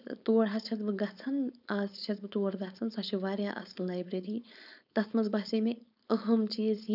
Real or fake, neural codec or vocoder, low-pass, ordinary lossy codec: fake; codec, 16 kHz, 4.8 kbps, FACodec; 5.4 kHz; none